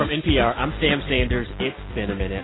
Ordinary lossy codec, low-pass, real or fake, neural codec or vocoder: AAC, 16 kbps; 7.2 kHz; real; none